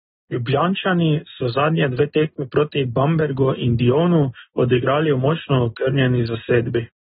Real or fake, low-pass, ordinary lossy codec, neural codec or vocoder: real; 19.8 kHz; AAC, 16 kbps; none